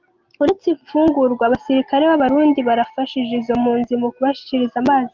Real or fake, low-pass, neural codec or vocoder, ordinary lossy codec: real; 7.2 kHz; none; Opus, 32 kbps